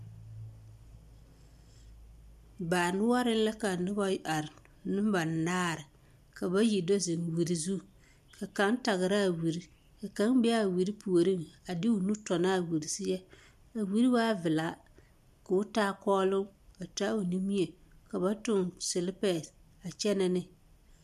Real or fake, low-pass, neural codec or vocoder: real; 14.4 kHz; none